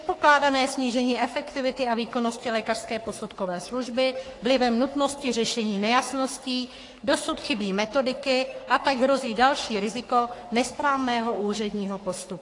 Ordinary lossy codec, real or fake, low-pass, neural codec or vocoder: AAC, 48 kbps; fake; 10.8 kHz; codec, 44.1 kHz, 3.4 kbps, Pupu-Codec